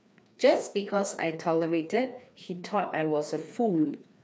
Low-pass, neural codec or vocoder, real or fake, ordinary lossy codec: none; codec, 16 kHz, 2 kbps, FreqCodec, larger model; fake; none